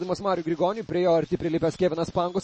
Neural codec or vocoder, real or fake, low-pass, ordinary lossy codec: none; real; 9.9 kHz; MP3, 32 kbps